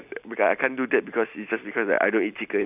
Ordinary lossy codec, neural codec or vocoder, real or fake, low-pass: none; none; real; 3.6 kHz